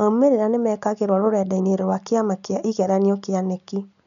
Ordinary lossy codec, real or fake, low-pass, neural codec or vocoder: none; real; 7.2 kHz; none